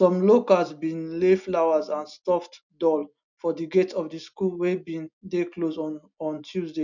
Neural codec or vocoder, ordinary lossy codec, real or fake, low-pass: none; none; real; 7.2 kHz